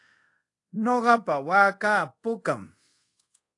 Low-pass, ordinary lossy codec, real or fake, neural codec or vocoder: 10.8 kHz; AAC, 48 kbps; fake; codec, 24 kHz, 0.5 kbps, DualCodec